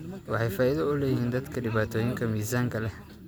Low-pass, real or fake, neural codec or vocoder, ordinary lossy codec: none; real; none; none